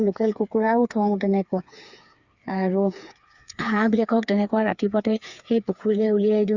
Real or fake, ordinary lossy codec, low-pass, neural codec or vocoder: fake; Opus, 64 kbps; 7.2 kHz; codec, 16 kHz, 4 kbps, FreqCodec, smaller model